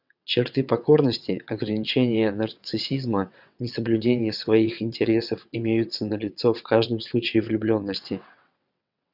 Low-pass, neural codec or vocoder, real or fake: 5.4 kHz; vocoder, 22.05 kHz, 80 mel bands, WaveNeXt; fake